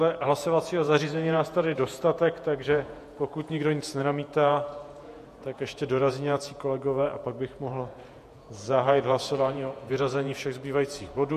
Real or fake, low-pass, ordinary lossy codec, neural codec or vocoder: fake; 14.4 kHz; MP3, 64 kbps; vocoder, 48 kHz, 128 mel bands, Vocos